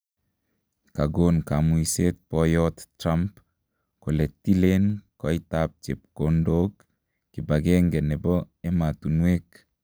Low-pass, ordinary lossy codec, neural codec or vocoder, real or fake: none; none; none; real